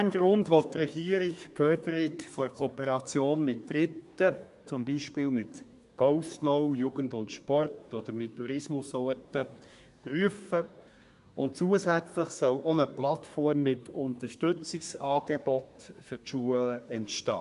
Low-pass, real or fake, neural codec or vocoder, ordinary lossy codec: 10.8 kHz; fake; codec, 24 kHz, 1 kbps, SNAC; none